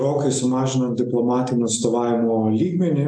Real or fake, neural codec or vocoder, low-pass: real; none; 9.9 kHz